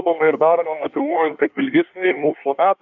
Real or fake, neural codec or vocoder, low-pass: fake; codec, 24 kHz, 1 kbps, SNAC; 7.2 kHz